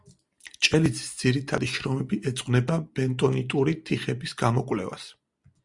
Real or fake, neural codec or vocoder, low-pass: real; none; 10.8 kHz